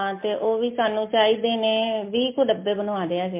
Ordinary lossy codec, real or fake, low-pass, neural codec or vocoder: MP3, 24 kbps; real; 3.6 kHz; none